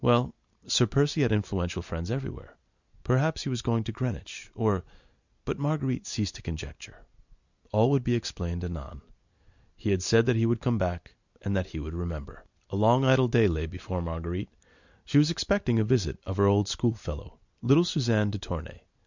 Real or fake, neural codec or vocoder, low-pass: real; none; 7.2 kHz